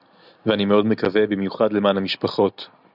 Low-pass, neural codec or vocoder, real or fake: 5.4 kHz; none; real